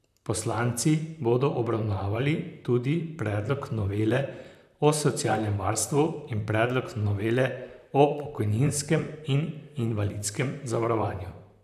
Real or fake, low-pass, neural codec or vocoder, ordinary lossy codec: fake; 14.4 kHz; vocoder, 44.1 kHz, 128 mel bands, Pupu-Vocoder; none